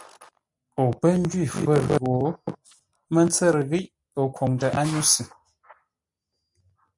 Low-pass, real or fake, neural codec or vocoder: 10.8 kHz; real; none